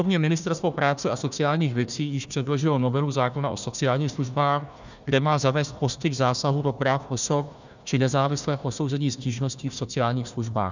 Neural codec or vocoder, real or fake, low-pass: codec, 16 kHz, 1 kbps, FunCodec, trained on Chinese and English, 50 frames a second; fake; 7.2 kHz